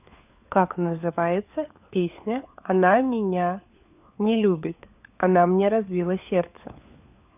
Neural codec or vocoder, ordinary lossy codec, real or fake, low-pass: codec, 16 kHz, 4 kbps, FunCodec, trained on LibriTTS, 50 frames a second; none; fake; 3.6 kHz